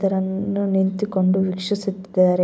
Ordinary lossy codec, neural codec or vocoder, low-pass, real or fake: none; none; none; real